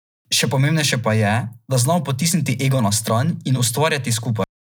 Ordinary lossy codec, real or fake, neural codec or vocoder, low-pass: none; real; none; none